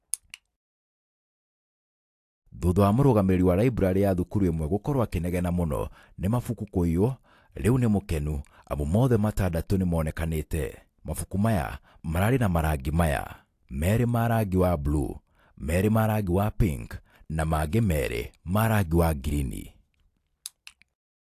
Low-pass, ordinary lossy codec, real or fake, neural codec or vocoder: 14.4 kHz; AAC, 64 kbps; real; none